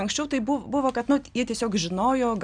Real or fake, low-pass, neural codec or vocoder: real; 9.9 kHz; none